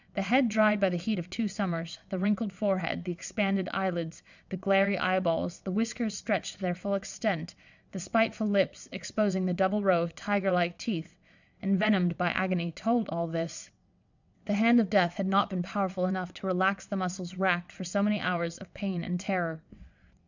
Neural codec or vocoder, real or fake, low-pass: vocoder, 22.05 kHz, 80 mel bands, WaveNeXt; fake; 7.2 kHz